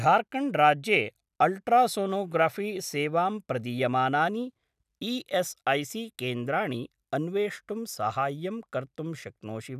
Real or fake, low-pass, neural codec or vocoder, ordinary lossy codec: real; 14.4 kHz; none; none